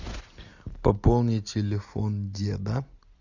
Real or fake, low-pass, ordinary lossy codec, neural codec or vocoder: real; 7.2 kHz; Opus, 64 kbps; none